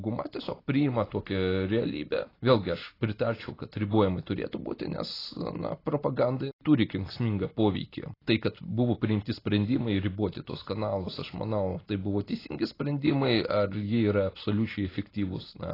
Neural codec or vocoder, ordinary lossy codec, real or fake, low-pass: none; AAC, 24 kbps; real; 5.4 kHz